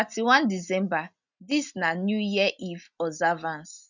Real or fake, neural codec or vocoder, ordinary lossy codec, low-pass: real; none; none; 7.2 kHz